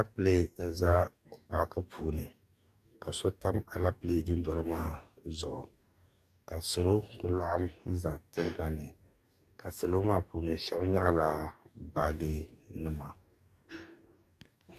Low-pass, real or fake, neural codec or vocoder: 14.4 kHz; fake; codec, 44.1 kHz, 2.6 kbps, DAC